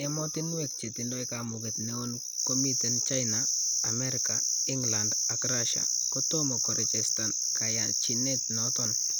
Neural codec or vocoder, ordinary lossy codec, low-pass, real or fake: none; none; none; real